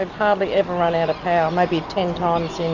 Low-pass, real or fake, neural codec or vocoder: 7.2 kHz; real; none